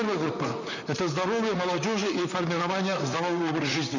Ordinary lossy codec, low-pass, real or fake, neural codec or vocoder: none; 7.2 kHz; fake; vocoder, 44.1 kHz, 128 mel bands, Pupu-Vocoder